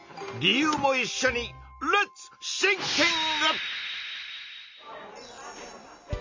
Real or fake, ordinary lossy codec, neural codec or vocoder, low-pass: real; MP3, 64 kbps; none; 7.2 kHz